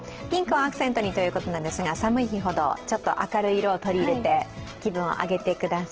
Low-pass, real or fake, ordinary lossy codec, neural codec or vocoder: 7.2 kHz; real; Opus, 16 kbps; none